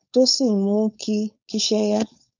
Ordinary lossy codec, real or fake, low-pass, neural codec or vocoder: none; fake; 7.2 kHz; codec, 16 kHz, 4.8 kbps, FACodec